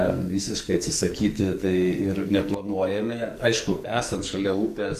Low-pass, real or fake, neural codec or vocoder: 14.4 kHz; fake; codec, 44.1 kHz, 2.6 kbps, SNAC